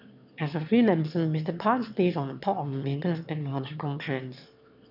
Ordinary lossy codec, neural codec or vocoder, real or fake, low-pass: none; autoencoder, 22.05 kHz, a latent of 192 numbers a frame, VITS, trained on one speaker; fake; 5.4 kHz